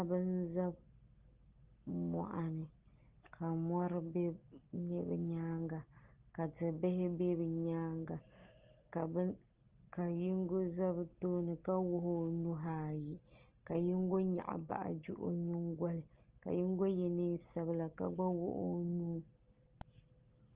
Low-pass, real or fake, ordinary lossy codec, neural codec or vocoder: 3.6 kHz; real; Opus, 16 kbps; none